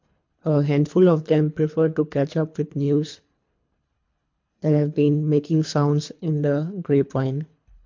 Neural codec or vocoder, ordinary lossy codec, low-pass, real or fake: codec, 24 kHz, 3 kbps, HILCodec; MP3, 48 kbps; 7.2 kHz; fake